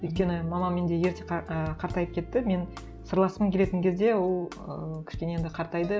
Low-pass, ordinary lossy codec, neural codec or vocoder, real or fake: none; none; none; real